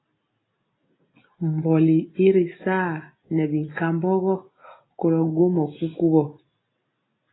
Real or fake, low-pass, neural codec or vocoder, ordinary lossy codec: real; 7.2 kHz; none; AAC, 16 kbps